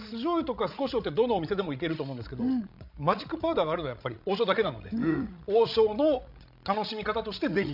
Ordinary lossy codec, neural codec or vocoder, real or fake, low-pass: none; codec, 16 kHz, 16 kbps, FreqCodec, larger model; fake; 5.4 kHz